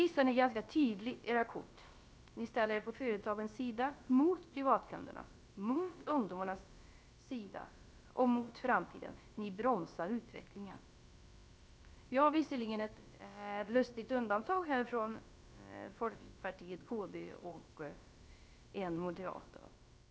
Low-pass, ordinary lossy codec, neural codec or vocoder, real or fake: none; none; codec, 16 kHz, about 1 kbps, DyCAST, with the encoder's durations; fake